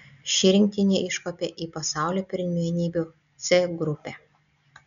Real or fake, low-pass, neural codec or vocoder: real; 7.2 kHz; none